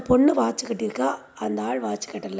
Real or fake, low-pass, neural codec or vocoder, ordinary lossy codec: real; none; none; none